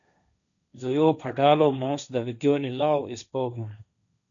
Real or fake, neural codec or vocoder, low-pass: fake; codec, 16 kHz, 1.1 kbps, Voila-Tokenizer; 7.2 kHz